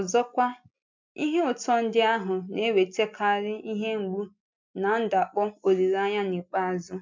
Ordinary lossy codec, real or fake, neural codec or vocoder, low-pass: MP3, 64 kbps; real; none; 7.2 kHz